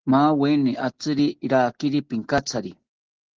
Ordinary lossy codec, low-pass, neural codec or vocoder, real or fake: Opus, 16 kbps; 7.2 kHz; none; real